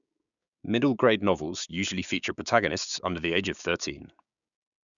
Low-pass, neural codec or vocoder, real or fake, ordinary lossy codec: 7.2 kHz; codec, 16 kHz, 6 kbps, DAC; fake; none